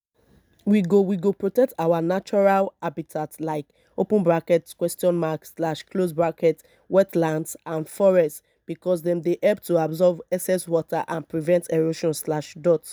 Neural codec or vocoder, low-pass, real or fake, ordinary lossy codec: none; none; real; none